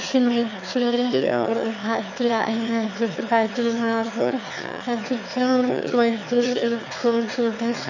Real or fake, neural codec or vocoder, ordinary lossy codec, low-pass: fake; autoencoder, 22.05 kHz, a latent of 192 numbers a frame, VITS, trained on one speaker; none; 7.2 kHz